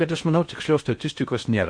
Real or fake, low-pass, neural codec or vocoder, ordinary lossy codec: fake; 9.9 kHz; codec, 16 kHz in and 24 kHz out, 0.6 kbps, FocalCodec, streaming, 4096 codes; MP3, 48 kbps